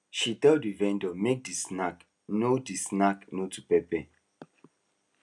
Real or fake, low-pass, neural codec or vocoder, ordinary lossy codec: real; none; none; none